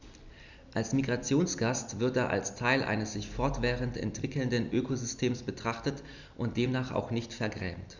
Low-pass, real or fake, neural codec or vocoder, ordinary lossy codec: 7.2 kHz; real; none; none